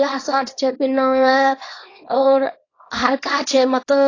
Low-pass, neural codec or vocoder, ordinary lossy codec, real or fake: 7.2 kHz; codec, 24 kHz, 0.9 kbps, WavTokenizer, small release; AAC, 32 kbps; fake